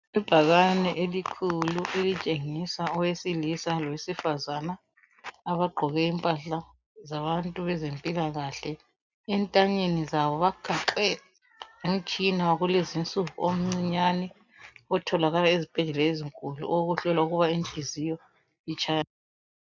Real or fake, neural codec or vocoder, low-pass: real; none; 7.2 kHz